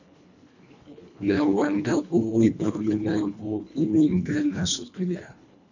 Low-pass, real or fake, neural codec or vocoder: 7.2 kHz; fake; codec, 24 kHz, 1.5 kbps, HILCodec